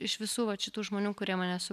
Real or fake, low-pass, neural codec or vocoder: real; 14.4 kHz; none